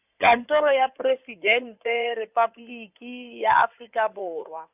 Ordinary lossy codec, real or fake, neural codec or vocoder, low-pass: none; fake; codec, 16 kHz in and 24 kHz out, 2.2 kbps, FireRedTTS-2 codec; 3.6 kHz